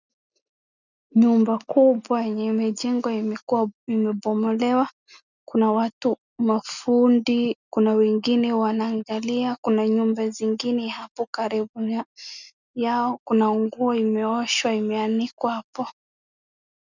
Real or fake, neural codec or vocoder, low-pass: real; none; 7.2 kHz